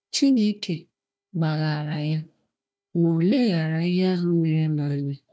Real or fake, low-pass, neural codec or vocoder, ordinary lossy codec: fake; none; codec, 16 kHz, 1 kbps, FunCodec, trained on Chinese and English, 50 frames a second; none